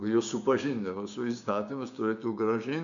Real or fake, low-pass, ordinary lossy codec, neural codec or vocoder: fake; 7.2 kHz; MP3, 96 kbps; codec, 16 kHz, 6 kbps, DAC